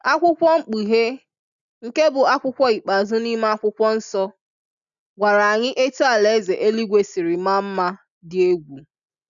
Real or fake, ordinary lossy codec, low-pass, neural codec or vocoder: real; none; 7.2 kHz; none